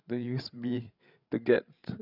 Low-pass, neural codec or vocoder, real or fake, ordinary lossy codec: 5.4 kHz; codec, 16 kHz, 8 kbps, FreqCodec, larger model; fake; none